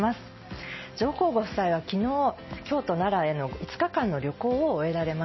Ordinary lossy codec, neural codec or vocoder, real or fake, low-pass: MP3, 24 kbps; none; real; 7.2 kHz